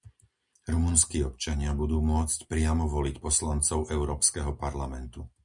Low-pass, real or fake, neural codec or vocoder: 10.8 kHz; real; none